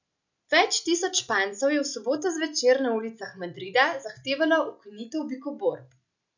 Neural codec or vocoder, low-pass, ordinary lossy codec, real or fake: none; 7.2 kHz; none; real